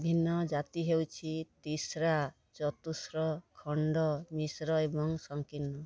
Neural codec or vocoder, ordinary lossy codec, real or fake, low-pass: none; none; real; none